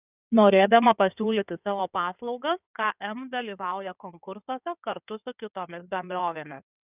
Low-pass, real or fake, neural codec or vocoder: 3.6 kHz; fake; codec, 16 kHz in and 24 kHz out, 2.2 kbps, FireRedTTS-2 codec